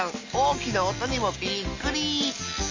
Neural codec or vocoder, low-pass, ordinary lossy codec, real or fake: none; 7.2 kHz; MP3, 32 kbps; real